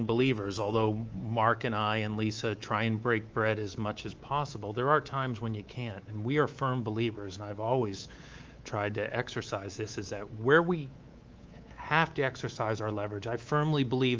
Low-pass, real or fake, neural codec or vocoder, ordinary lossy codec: 7.2 kHz; fake; codec, 24 kHz, 3.1 kbps, DualCodec; Opus, 24 kbps